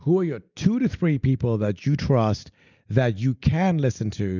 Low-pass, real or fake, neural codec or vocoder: 7.2 kHz; real; none